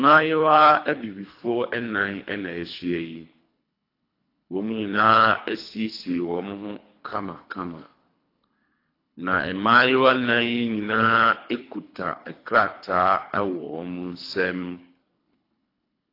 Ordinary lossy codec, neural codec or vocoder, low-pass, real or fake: AAC, 32 kbps; codec, 24 kHz, 3 kbps, HILCodec; 5.4 kHz; fake